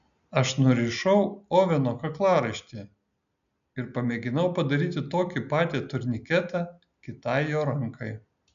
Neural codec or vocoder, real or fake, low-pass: none; real; 7.2 kHz